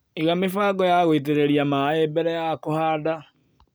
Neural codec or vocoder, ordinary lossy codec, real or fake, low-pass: none; none; real; none